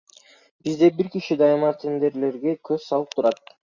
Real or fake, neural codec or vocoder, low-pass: real; none; 7.2 kHz